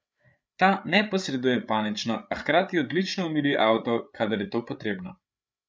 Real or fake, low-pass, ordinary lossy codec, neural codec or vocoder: fake; none; none; codec, 16 kHz, 8 kbps, FreqCodec, larger model